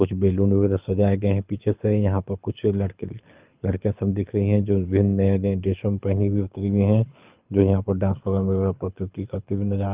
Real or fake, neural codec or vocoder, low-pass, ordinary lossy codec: fake; codec, 24 kHz, 6 kbps, HILCodec; 3.6 kHz; Opus, 24 kbps